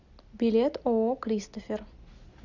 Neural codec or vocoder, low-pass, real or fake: none; 7.2 kHz; real